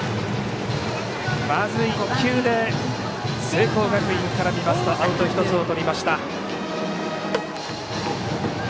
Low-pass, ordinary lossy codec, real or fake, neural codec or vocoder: none; none; real; none